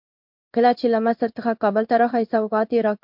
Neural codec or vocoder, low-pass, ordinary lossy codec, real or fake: codec, 16 kHz in and 24 kHz out, 1 kbps, XY-Tokenizer; 5.4 kHz; MP3, 48 kbps; fake